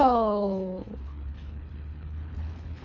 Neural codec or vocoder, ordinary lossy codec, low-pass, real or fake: codec, 24 kHz, 3 kbps, HILCodec; none; 7.2 kHz; fake